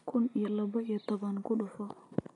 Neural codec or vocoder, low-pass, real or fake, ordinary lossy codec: none; 10.8 kHz; real; none